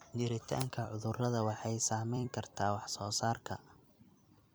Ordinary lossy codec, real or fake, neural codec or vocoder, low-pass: none; fake; vocoder, 44.1 kHz, 128 mel bands every 256 samples, BigVGAN v2; none